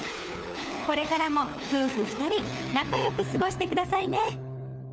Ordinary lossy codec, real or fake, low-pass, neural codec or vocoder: none; fake; none; codec, 16 kHz, 4 kbps, FunCodec, trained on LibriTTS, 50 frames a second